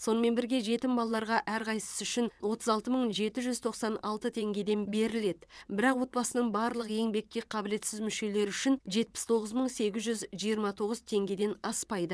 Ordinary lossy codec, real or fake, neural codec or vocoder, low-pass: none; fake; vocoder, 22.05 kHz, 80 mel bands, WaveNeXt; none